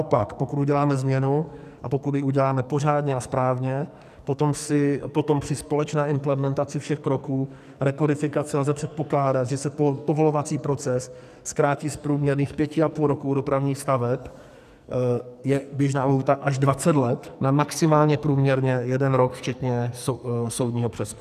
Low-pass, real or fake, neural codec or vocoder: 14.4 kHz; fake; codec, 44.1 kHz, 2.6 kbps, SNAC